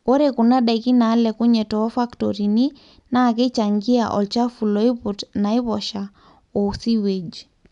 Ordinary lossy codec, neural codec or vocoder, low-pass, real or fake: none; none; 10.8 kHz; real